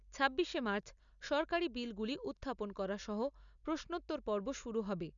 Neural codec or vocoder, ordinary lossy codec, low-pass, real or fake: none; none; 7.2 kHz; real